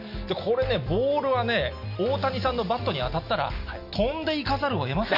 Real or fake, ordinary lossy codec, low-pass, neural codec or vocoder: real; none; 5.4 kHz; none